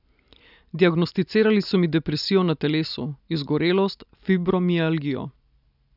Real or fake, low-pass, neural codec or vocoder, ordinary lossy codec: real; 5.4 kHz; none; none